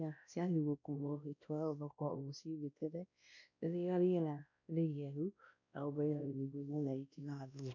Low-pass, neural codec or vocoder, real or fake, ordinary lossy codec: 7.2 kHz; codec, 16 kHz, 1 kbps, X-Codec, WavLM features, trained on Multilingual LibriSpeech; fake; none